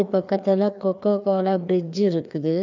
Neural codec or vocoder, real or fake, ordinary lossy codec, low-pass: codec, 16 kHz, 2 kbps, FreqCodec, larger model; fake; none; 7.2 kHz